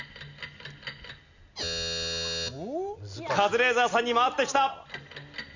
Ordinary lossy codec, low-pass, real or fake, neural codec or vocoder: none; 7.2 kHz; real; none